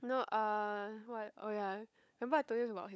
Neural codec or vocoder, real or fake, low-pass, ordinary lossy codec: codec, 16 kHz, 16 kbps, FunCodec, trained on LibriTTS, 50 frames a second; fake; none; none